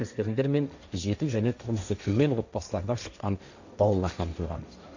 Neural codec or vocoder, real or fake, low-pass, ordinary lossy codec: codec, 16 kHz, 1.1 kbps, Voila-Tokenizer; fake; 7.2 kHz; none